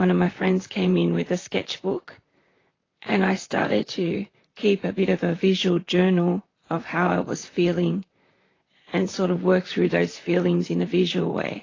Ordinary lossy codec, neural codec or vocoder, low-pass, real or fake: AAC, 32 kbps; vocoder, 44.1 kHz, 128 mel bands, Pupu-Vocoder; 7.2 kHz; fake